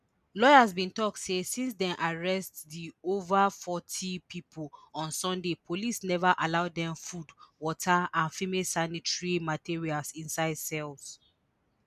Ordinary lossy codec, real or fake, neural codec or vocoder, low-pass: none; real; none; 14.4 kHz